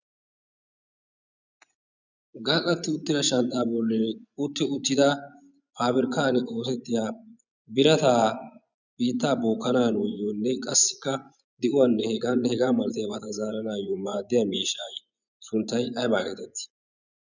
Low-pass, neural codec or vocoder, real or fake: 7.2 kHz; vocoder, 24 kHz, 100 mel bands, Vocos; fake